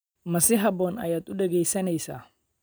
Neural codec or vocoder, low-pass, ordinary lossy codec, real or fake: none; none; none; real